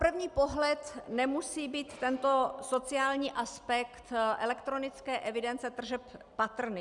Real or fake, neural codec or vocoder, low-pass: real; none; 10.8 kHz